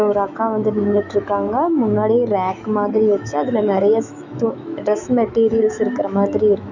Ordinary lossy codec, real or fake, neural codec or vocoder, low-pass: none; fake; autoencoder, 48 kHz, 128 numbers a frame, DAC-VAE, trained on Japanese speech; 7.2 kHz